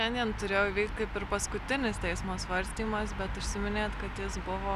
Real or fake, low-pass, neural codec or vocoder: real; 14.4 kHz; none